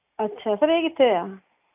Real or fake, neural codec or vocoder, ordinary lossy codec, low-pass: real; none; AAC, 24 kbps; 3.6 kHz